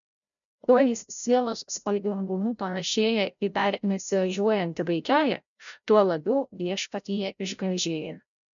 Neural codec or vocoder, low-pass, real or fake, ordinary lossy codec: codec, 16 kHz, 0.5 kbps, FreqCodec, larger model; 7.2 kHz; fake; MP3, 96 kbps